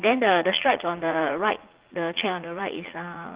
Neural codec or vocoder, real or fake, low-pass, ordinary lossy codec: vocoder, 44.1 kHz, 80 mel bands, Vocos; fake; 3.6 kHz; Opus, 16 kbps